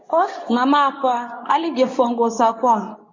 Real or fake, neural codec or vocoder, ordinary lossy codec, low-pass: fake; codec, 24 kHz, 0.9 kbps, WavTokenizer, medium speech release version 2; MP3, 32 kbps; 7.2 kHz